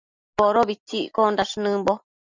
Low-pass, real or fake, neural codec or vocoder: 7.2 kHz; real; none